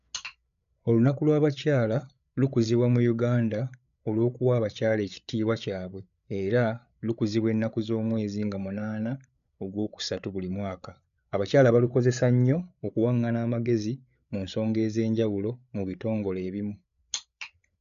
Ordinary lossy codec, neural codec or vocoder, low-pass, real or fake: none; codec, 16 kHz, 8 kbps, FreqCodec, larger model; 7.2 kHz; fake